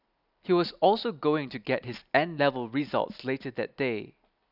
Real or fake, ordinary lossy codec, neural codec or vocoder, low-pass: real; none; none; 5.4 kHz